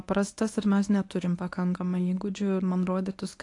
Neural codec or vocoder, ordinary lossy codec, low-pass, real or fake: codec, 24 kHz, 0.9 kbps, WavTokenizer, medium speech release version 1; AAC, 48 kbps; 10.8 kHz; fake